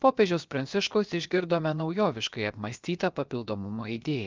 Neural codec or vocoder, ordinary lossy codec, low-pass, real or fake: codec, 16 kHz, 0.7 kbps, FocalCodec; Opus, 24 kbps; 7.2 kHz; fake